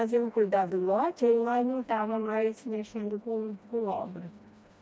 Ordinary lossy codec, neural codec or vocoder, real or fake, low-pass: none; codec, 16 kHz, 1 kbps, FreqCodec, smaller model; fake; none